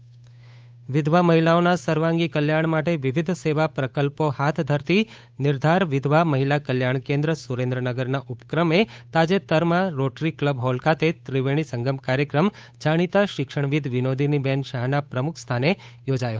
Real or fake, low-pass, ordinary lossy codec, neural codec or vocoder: fake; none; none; codec, 16 kHz, 8 kbps, FunCodec, trained on Chinese and English, 25 frames a second